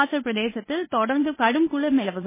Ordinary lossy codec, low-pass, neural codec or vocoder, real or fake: MP3, 16 kbps; 3.6 kHz; codec, 24 kHz, 0.9 kbps, WavTokenizer, medium speech release version 1; fake